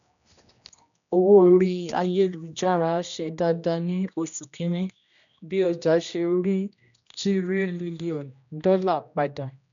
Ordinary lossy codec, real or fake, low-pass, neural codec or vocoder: none; fake; 7.2 kHz; codec, 16 kHz, 1 kbps, X-Codec, HuBERT features, trained on general audio